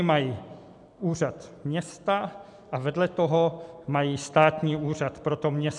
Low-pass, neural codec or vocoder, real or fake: 10.8 kHz; none; real